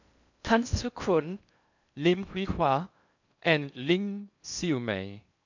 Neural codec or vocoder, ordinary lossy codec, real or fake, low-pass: codec, 16 kHz in and 24 kHz out, 0.6 kbps, FocalCodec, streaming, 2048 codes; none; fake; 7.2 kHz